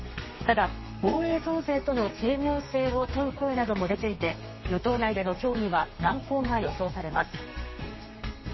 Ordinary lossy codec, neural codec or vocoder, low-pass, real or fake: MP3, 24 kbps; codec, 32 kHz, 1.9 kbps, SNAC; 7.2 kHz; fake